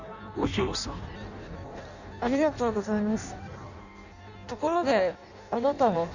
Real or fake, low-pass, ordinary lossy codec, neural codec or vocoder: fake; 7.2 kHz; none; codec, 16 kHz in and 24 kHz out, 0.6 kbps, FireRedTTS-2 codec